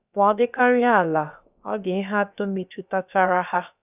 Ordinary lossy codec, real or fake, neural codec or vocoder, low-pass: none; fake; codec, 16 kHz, about 1 kbps, DyCAST, with the encoder's durations; 3.6 kHz